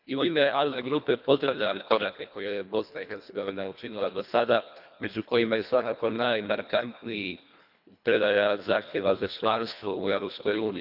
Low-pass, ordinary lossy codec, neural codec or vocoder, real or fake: 5.4 kHz; none; codec, 24 kHz, 1.5 kbps, HILCodec; fake